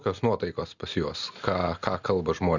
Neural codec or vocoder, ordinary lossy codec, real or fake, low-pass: none; Opus, 64 kbps; real; 7.2 kHz